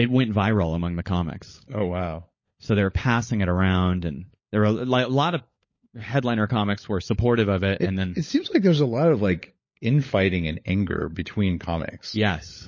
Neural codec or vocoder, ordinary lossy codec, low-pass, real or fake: codec, 16 kHz, 16 kbps, FunCodec, trained on LibriTTS, 50 frames a second; MP3, 32 kbps; 7.2 kHz; fake